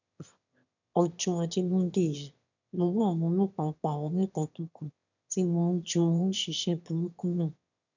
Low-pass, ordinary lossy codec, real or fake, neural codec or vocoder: 7.2 kHz; none; fake; autoencoder, 22.05 kHz, a latent of 192 numbers a frame, VITS, trained on one speaker